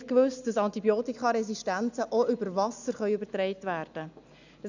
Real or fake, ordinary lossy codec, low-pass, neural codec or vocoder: real; none; 7.2 kHz; none